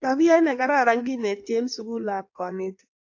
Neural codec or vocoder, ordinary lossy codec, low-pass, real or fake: codec, 16 kHz in and 24 kHz out, 1.1 kbps, FireRedTTS-2 codec; AAC, 48 kbps; 7.2 kHz; fake